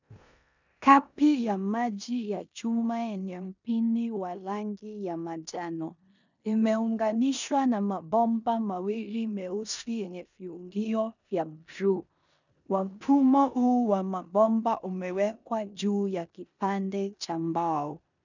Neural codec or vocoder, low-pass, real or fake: codec, 16 kHz in and 24 kHz out, 0.9 kbps, LongCat-Audio-Codec, four codebook decoder; 7.2 kHz; fake